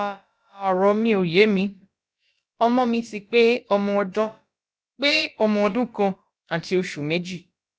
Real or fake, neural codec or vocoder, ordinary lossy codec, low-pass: fake; codec, 16 kHz, about 1 kbps, DyCAST, with the encoder's durations; none; none